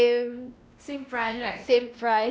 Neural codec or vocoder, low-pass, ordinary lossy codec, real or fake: codec, 16 kHz, 1 kbps, X-Codec, WavLM features, trained on Multilingual LibriSpeech; none; none; fake